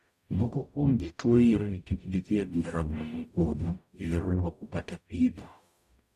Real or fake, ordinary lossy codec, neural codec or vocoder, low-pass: fake; none; codec, 44.1 kHz, 0.9 kbps, DAC; 14.4 kHz